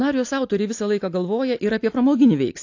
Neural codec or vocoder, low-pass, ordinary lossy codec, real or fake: none; 7.2 kHz; AAC, 48 kbps; real